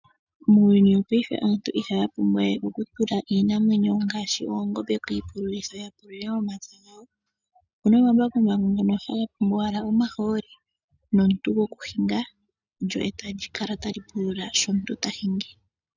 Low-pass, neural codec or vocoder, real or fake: 7.2 kHz; none; real